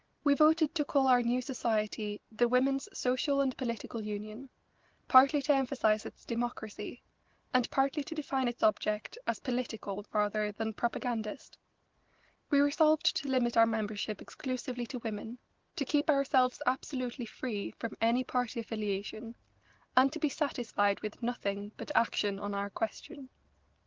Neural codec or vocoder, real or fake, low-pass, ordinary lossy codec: none; real; 7.2 kHz; Opus, 16 kbps